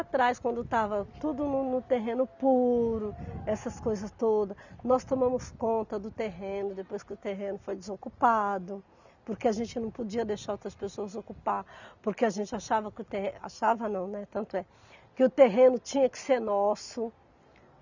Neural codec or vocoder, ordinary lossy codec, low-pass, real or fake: none; none; 7.2 kHz; real